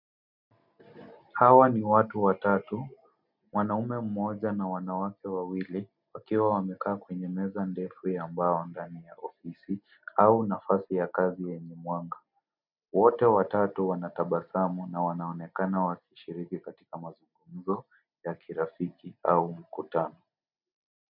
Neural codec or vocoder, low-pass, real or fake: none; 5.4 kHz; real